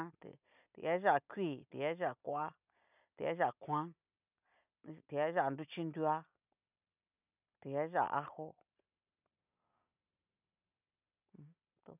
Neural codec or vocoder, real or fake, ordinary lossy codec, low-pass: none; real; none; 3.6 kHz